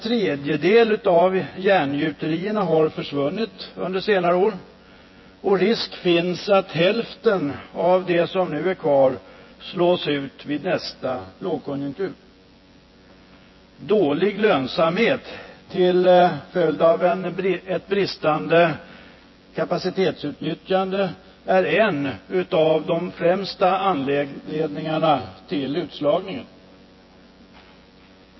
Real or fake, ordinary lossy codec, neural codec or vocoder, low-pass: fake; MP3, 24 kbps; vocoder, 24 kHz, 100 mel bands, Vocos; 7.2 kHz